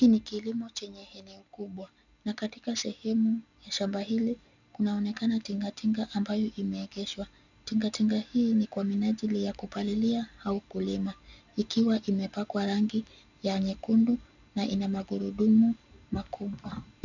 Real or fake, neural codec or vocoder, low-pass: real; none; 7.2 kHz